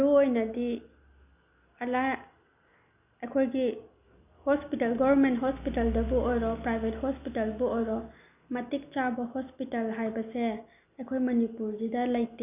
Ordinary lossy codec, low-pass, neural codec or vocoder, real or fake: none; 3.6 kHz; none; real